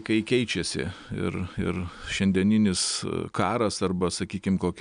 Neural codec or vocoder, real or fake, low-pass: none; real; 9.9 kHz